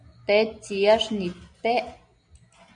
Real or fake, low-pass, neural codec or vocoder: real; 9.9 kHz; none